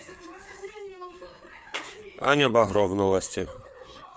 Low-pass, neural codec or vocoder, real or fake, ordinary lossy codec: none; codec, 16 kHz, 4 kbps, FreqCodec, larger model; fake; none